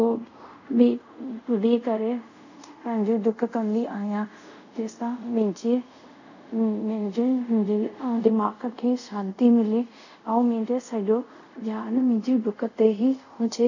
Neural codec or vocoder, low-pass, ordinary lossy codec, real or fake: codec, 24 kHz, 0.5 kbps, DualCodec; 7.2 kHz; none; fake